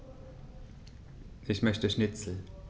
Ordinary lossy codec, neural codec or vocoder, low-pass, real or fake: none; none; none; real